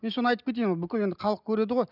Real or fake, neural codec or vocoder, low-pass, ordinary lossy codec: real; none; 5.4 kHz; none